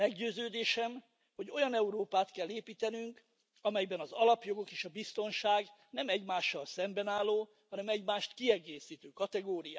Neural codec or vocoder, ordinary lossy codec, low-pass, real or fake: none; none; none; real